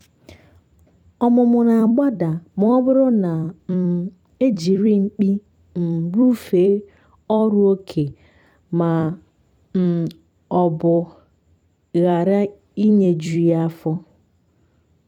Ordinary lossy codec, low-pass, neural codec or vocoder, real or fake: none; 19.8 kHz; vocoder, 44.1 kHz, 128 mel bands every 256 samples, BigVGAN v2; fake